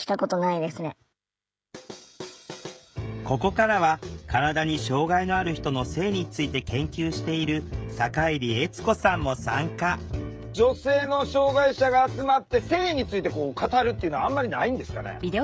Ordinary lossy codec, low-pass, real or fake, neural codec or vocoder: none; none; fake; codec, 16 kHz, 16 kbps, FreqCodec, smaller model